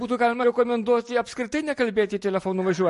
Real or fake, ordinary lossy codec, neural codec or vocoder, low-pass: real; MP3, 48 kbps; none; 14.4 kHz